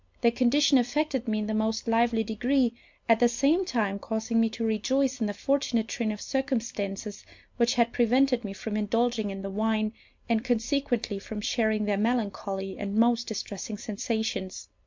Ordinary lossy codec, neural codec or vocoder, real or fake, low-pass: MP3, 64 kbps; none; real; 7.2 kHz